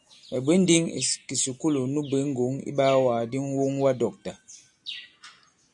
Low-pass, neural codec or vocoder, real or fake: 10.8 kHz; none; real